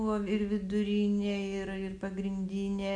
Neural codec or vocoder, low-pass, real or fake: none; 9.9 kHz; real